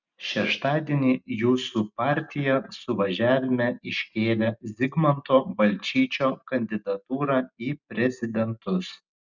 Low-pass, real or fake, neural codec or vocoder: 7.2 kHz; real; none